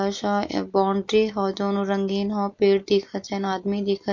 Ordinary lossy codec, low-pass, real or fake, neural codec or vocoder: MP3, 48 kbps; 7.2 kHz; real; none